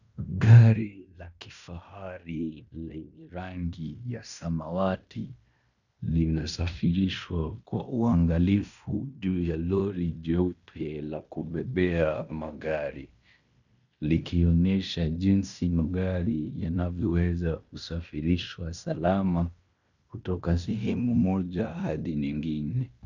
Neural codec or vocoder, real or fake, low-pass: codec, 16 kHz in and 24 kHz out, 0.9 kbps, LongCat-Audio-Codec, fine tuned four codebook decoder; fake; 7.2 kHz